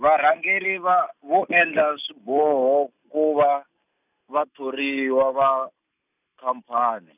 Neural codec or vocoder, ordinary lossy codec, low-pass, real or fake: none; none; 3.6 kHz; real